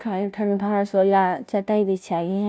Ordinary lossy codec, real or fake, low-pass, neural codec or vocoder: none; fake; none; codec, 16 kHz, 0.5 kbps, FunCodec, trained on Chinese and English, 25 frames a second